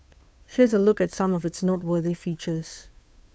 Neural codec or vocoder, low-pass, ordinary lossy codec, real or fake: codec, 16 kHz, 2 kbps, FunCodec, trained on Chinese and English, 25 frames a second; none; none; fake